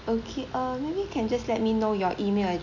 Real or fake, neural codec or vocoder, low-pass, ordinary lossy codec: real; none; 7.2 kHz; none